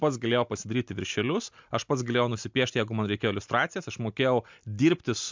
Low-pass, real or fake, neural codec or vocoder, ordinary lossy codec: 7.2 kHz; real; none; MP3, 64 kbps